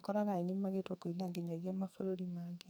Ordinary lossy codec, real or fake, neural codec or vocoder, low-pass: none; fake; codec, 44.1 kHz, 2.6 kbps, SNAC; none